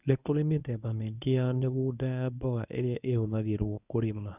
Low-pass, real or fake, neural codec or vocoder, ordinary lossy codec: 3.6 kHz; fake; codec, 24 kHz, 0.9 kbps, WavTokenizer, medium speech release version 1; none